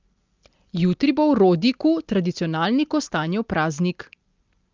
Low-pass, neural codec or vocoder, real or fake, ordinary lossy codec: 7.2 kHz; none; real; Opus, 32 kbps